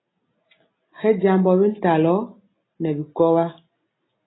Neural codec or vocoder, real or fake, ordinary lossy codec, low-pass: none; real; AAC, 16 kbps; 7.2 kHz